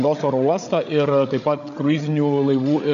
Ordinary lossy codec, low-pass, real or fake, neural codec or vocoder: AAC, 64 kbps; 7.2 kHz; fake; codec, 16 kHz, 8 kbps, FreqCodec, larger model